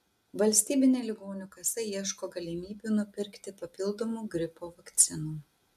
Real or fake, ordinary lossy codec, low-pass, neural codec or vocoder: real; AAC, 96 kbps; 14.4 kHz; none